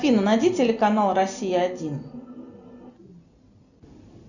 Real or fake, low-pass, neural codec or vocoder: real; 7.2 kHz; none